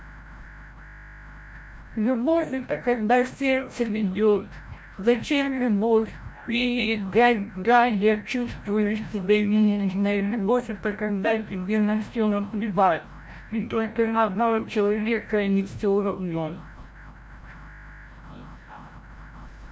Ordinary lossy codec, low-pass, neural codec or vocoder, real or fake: none; none; codec, 16 kHz, 0.5 kbps, FreqCodec, larger model; fake